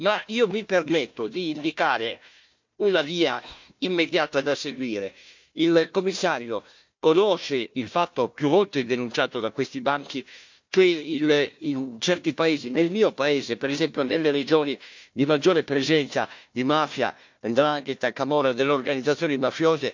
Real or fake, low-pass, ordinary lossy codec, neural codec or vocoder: fake; 7.2 kHz; MP3, 64 kbps; codec, 16 kHz, 1 kbps, FunCodec, trained on Chinese and English, 50 frames a second